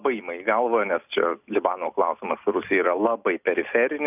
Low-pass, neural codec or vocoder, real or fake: 3.6 kHz; none; real